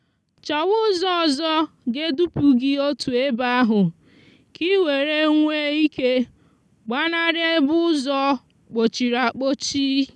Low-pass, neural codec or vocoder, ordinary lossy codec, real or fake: none; none; none; real